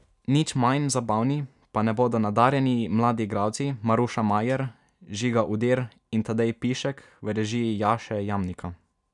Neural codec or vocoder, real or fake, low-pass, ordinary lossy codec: none; real; 10.8 kHz; none